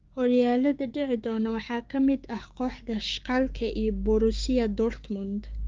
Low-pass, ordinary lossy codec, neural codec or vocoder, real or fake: 7.2 kHz; Opus, 24 kbps; codec, 16 kHz, 6 kbps, DAC; fake